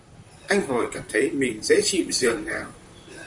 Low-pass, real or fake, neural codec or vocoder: 10.8 kHz; fake; vocoder, 44.1 kHz, 128 mel bands, Pupu-Vocoder